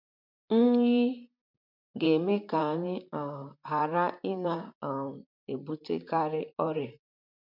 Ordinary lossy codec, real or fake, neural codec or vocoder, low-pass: MP3, 48 kbps; fake; vocoder, 44.1 kHz, 128 mel bands, Pupu-Vocoder; 5.4 kHz